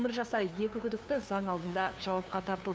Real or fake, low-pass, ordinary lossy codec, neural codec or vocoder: fake; none; none; codec, 16 kHz, 2 kbps, FunCodec, trained on LibriTTS, 25 frames a second